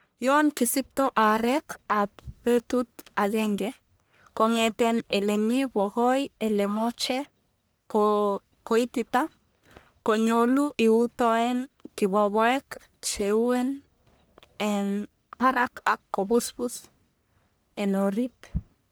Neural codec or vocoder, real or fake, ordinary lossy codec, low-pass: codec, 44.1 kHz, 1.7 kbps, Pupu-Codec; fake; none; none